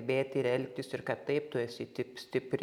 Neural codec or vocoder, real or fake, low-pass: none; real; 19.8 kHz